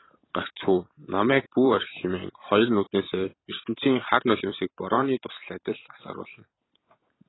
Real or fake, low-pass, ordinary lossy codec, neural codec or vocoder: fake; 7.2 kHz; AAC, 16 kbps; vocoder, 44.1 kHz, 128 mel bands every 256 samples, BigVGAN v2